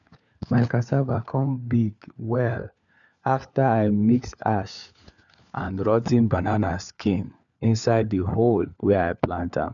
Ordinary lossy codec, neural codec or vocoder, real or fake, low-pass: none; codec, 16 kHz, 4 kbps, FunCodec, trained on LibriTTS, 50 frames a second; fake; 7.2 kHz